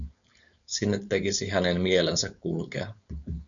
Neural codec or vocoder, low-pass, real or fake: codec, 16 kHz, 4.8 kbps, FACodec; 7.2 kHz; fake